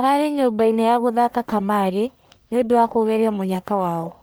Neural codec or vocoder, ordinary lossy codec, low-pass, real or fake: codec, 44.1 kHz, 1.7 kbps, Pupu-Codec; none; none; fake